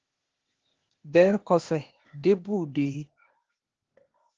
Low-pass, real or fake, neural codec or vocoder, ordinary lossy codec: 7.2 kHz; fake; codec, 16 kHz, 0.8 kbps, ZipCodec; Opus, 16 kbps